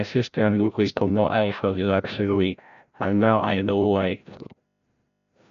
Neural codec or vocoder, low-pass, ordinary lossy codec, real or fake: codec, 16 kHz, 0.5 kbps, FreqCodec, larger model; 7.2 kHz; none; fake